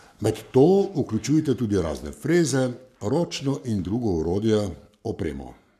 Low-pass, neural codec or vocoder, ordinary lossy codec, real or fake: 14.4 kHz; codec, 44.1 kHz, 7.8 kbps, Pupu-Codec; none; fake